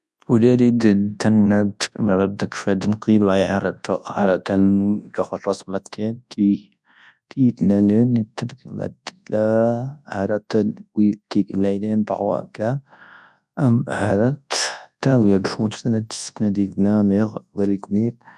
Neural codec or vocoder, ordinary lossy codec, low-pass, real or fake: codec, 24 kHz, 0.9 kbps, WavTokenizer, large speech release; none; none; fake